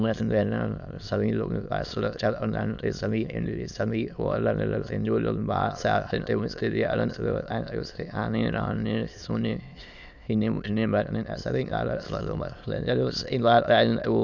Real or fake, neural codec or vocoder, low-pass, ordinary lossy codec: fake; autoencoder, 22.05 kHz, a latent of 192 numbers a frame, VITS, trained on many speakers; 7.2 kHz; none